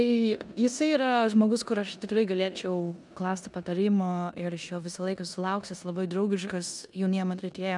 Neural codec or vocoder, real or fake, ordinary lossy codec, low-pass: codec, 16 kHz in and 24 kHz out, 0.9 kbps, LongCat-Audio-Codec, four codebook decoder; fake; MP3, 96 kbps; 10.8 kHz